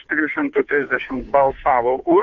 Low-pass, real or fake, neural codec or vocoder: 7.2 kHz; fake; codec, 16 kHz, 2 kbps, FunCodec, trained on Chinese and English, 25 frames a second